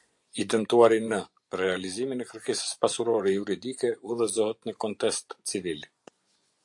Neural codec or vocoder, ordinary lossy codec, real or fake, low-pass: vocoder, 24 kHz, 100 mel bands, Vocos; AAC, 64 kbps; fake; 10.8 kHz